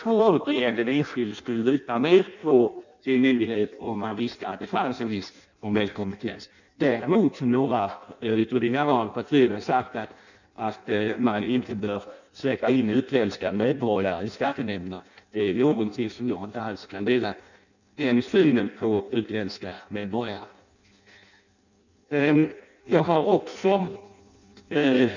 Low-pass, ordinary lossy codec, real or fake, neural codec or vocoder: 7.2 kHz; none; fake; codec, 16 kHz in and 24 kHz out, 0.6 kbps, FireRedTTS-2 codec